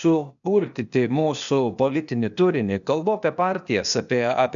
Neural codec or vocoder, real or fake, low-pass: codec, 16 kHz, 0.8 kbps, ZipCodec; fake; 7.2 kHz